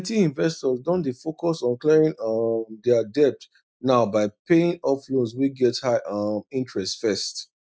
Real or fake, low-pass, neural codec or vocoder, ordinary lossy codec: real; none; none; none